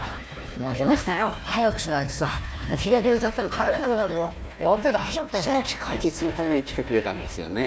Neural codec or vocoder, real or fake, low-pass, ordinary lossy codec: codec, 16 kHz, 1 kbps, FunCodec, trained on Chinese and English, 50 frames a second; fake; none; none